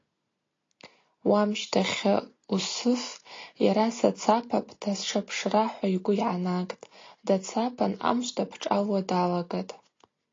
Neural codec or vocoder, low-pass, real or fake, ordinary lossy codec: none; 7.2 kHz; real; AAC, 32 kbps